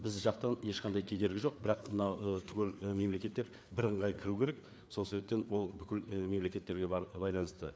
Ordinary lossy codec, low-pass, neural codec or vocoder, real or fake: none; none; codec, 16 kHz, 4 kbps, FreqCodec, larger model; fake